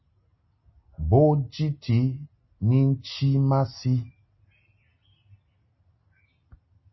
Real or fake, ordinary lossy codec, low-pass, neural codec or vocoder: real; MP3, 24 kbps; 7.2 kHz; none